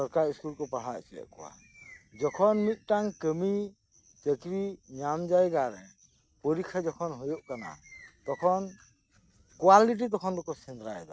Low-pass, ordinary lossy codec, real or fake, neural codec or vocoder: none; none; real; none